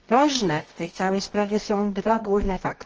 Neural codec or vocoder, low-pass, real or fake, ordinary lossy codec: codec, 24 kHz, 0.9 kbps, WavTokenizer, medium music audio release; 7.2 kHz; fake; Opus, 24 kbps